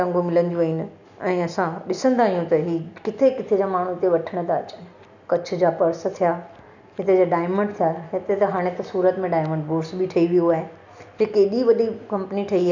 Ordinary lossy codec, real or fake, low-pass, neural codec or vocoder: none; real; 7.2 kHz; none